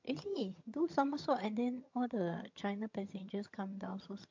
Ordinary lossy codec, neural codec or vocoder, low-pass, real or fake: MP3, 48 kbps; vocoder, 22.05 kHz, 80 mel bands, HiFi-GAN; 7.2 kHz; fake